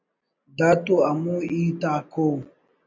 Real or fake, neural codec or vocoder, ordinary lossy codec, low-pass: real; none; MP3, 48 kbps; 7.2 kHz